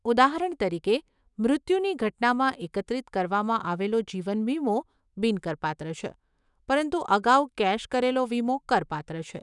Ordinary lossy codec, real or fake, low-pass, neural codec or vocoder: none; fake; 10.8 kHz; autoencoder, 48 kHz, 128 numbers a frame, DAC-VAE, trained on Japanese speech